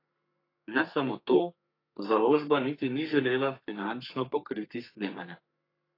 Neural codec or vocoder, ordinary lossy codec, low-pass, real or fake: codec, 32 kHz, 1.9 kbps, SNAC; AAC, 32 kbps; 5.4 kHz; fake